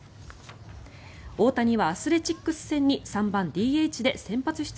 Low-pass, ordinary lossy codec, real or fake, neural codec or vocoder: none; none; real; none